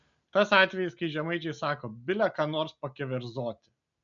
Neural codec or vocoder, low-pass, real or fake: none; 7.2 kHz; real